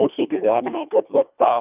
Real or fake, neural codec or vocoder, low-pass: fake; codec, 24 kHz, 1.5 kbps, HILCodec; 3.6 kHz